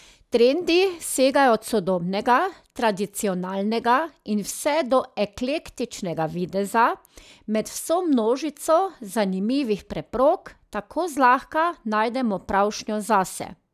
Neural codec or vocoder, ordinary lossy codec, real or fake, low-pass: none; none; real; 14.4 kHz